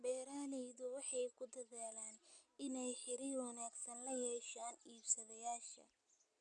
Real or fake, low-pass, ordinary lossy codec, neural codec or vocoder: real; 9.9 kHz; none; none